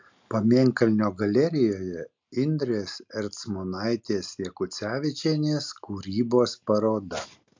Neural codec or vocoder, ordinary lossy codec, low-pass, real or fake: none; MP3, 64 kbps; 7.2 kHz; real